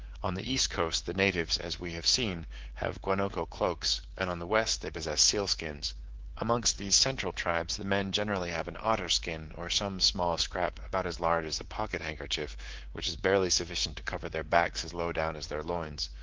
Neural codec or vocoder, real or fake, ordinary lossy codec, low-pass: codec, 24 kHz, 3.1 kbps, DualCodec; fake; Opus, 16 kbps; 7.2 kHz